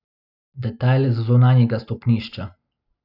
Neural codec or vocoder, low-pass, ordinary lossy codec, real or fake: none; 5.4 kHz; none; real